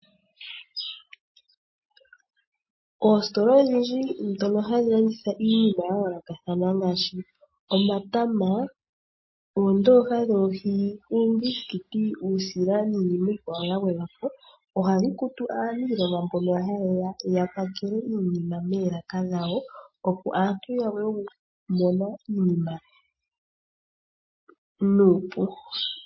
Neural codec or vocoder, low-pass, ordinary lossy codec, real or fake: none; 7.2 kHz; MP3, 24 kbps; real